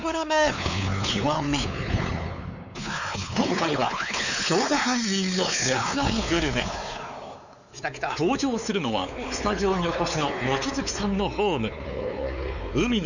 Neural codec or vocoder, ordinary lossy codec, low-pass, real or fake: codec, 16 kHz, 4 kbps, X-Codec, WavLM features, trained on Multilingual LibriSpeech; none; 7.2 kHz; fake